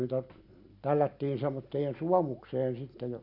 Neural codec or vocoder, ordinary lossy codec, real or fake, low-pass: none; none; real; 5.4 kHz